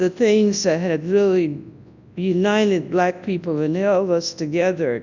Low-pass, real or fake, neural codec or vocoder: 7.2 kHz; fake; codec, 24 kHz, 0.9 kbps, WavTokenizer, large speech release